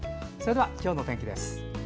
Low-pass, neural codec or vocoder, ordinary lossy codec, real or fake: none; none; none; real